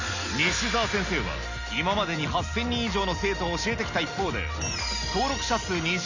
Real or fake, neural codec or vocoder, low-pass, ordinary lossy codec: real; none; 7.2 kHz; none